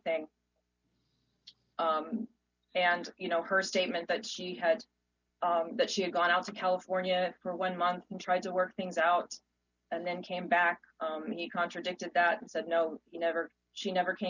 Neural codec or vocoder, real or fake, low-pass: none; real; 7.2 kHz